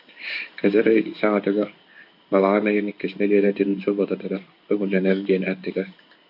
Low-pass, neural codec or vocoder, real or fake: 5.4 kHz; codec, 16 kHz in and 24 kHz out, 1 kbps, XY-Tokenizer; fake